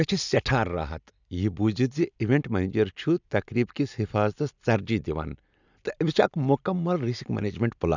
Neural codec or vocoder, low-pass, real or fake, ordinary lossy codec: none; 7.2 kHz; real; none